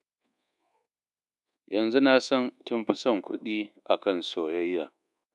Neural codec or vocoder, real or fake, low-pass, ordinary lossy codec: codec, 24 kHz, 1.2 kbps, DualCodec; fake; none; none